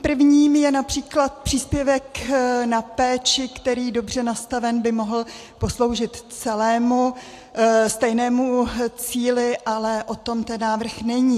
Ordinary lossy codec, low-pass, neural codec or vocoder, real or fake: AAC, 64 kbps; 14.4 kHz; none; real